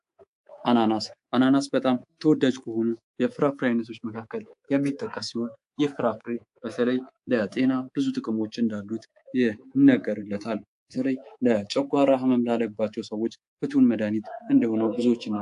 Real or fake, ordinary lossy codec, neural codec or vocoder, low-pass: fake; AAC, 64 kbps; codec, 24 kHz, 3.1 kbps, DualCodec; 10.8 kHz